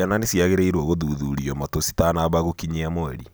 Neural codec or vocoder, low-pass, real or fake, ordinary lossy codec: none; none; real; none